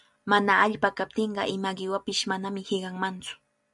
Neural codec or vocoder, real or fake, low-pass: none; real; 10.8 kHz